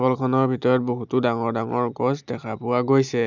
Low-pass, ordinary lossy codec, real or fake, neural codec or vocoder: 7.2 kHz; none; real; none